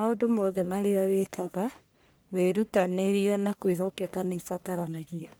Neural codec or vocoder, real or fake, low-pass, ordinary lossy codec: codec, 44.1 kHz, 1.7 kbps, Pupu-Codec; fake; none; none